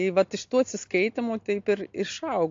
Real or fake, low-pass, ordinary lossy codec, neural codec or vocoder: real; 7.2 kHz; AAC, 48 kbps; none